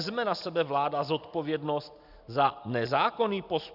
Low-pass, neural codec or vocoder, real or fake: 5.4 kHz; none; real